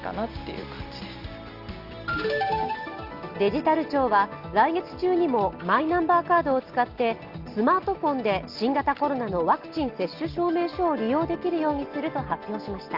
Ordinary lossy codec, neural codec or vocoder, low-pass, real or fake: Opus, 32 kbps; none; 5.4 kHz; real